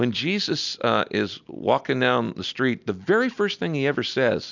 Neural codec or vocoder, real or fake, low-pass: none; real; 7.2 kHz